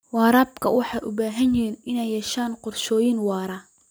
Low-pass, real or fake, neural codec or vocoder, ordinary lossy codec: none; real; none; none